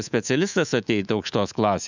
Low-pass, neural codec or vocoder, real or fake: 7.2 kHz; codec, 24 kHz, 3.1 kbps, DualCodec; fake